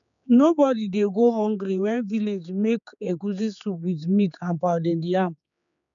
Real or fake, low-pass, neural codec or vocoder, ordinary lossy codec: fake; 7.2 kHz; codec, 16 kHz, 4 kbps, X-Codec, HuBERT features, trained on general audio; none